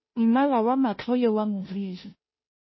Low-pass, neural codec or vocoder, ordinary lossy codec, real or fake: 7.2 kHz; codec, 16 kHz, 0.5 kbps, FunCodec, trained on Chinese and English, 25 frames a second; MP3, 24 kbps; fake